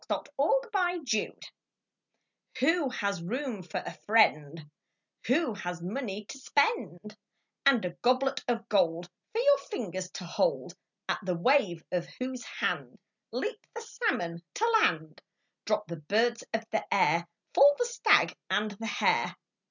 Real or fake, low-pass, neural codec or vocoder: real; 7.2 kHz; none